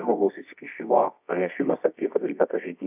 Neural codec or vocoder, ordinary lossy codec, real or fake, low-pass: codec, 24 kHz, 0.9 kbps, WavTokenizer, medium music audio release; AAC, 32 kbps; fake; 3.6 kHz